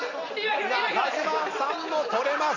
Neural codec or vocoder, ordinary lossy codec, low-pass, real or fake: none; none; 7.2 kHz; real